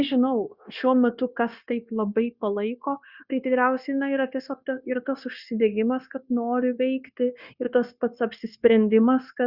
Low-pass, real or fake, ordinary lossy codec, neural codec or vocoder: 5.4 kHz; fake; Opus, 64 kbps; codec, 16 kHz, 0.9 kbps, LongCat-Audio-Codec